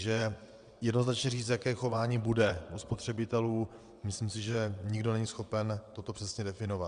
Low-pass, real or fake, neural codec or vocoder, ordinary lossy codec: 9.9 kHz; fake; vocoder, 22.05 kHz, 80 mel bands, WaveNeXt; Opus, 64 kbps